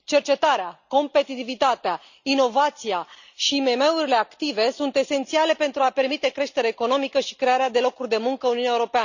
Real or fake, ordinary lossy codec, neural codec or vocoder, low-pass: real; none; none; 7.2 kHz